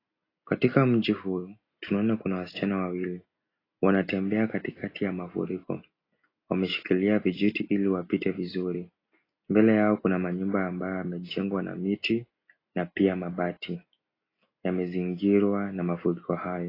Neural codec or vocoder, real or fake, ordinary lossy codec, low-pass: none; real; AAC, 24 kbps; 5.4 kHz